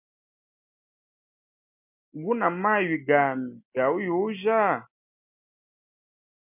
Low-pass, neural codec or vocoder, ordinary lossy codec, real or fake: 3.6 kHz; none; MP3, 32 kbps; real